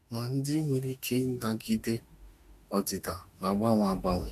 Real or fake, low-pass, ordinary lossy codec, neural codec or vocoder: fake; 14.4 kHz; AAC, 64 kbps; autoencoder, 48 kHz, 32 numbers a frame, DAC-VAE, trained on Japanese speech